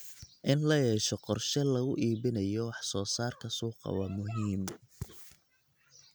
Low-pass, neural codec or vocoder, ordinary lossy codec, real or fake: none; none; none; real